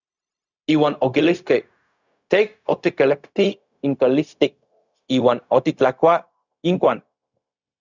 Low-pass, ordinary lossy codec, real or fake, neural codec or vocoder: 7.2 kHz; Opus, 64 kbps; fake; codec, 16 kHz, 0.4 kbps, LongCat-Audio-Codec